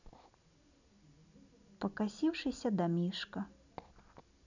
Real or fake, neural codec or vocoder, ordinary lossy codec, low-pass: real; none; none; 7.2 kHz